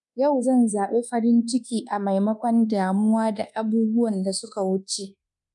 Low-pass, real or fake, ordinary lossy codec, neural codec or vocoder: 10.8 kHz; fake; none; codec, 24 kHz, 1.2 kbps, DualCodec